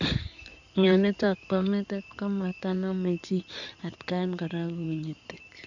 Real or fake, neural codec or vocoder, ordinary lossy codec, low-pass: fake; codec, 16 kHz in and 24 kHz out, 2.2 kbps, FireRedTTS-2 codec; none; 7.2 kHz